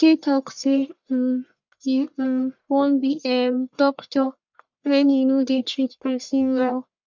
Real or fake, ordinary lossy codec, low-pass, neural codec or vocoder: fake; MP3, 64 kbps; 7.2 kHz; codec, 44.1 kHz, 1.7 kbps, Pupu-Codec